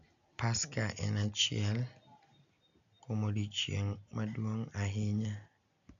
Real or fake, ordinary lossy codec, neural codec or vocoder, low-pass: real; none; none; 7.2 kHz